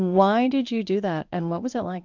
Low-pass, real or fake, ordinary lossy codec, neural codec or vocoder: 7.2 kHz; fake; MP3, 64 kbps; codec, 16 kHz in and 24 kHz out, 1 kbps, XY-Tokenizer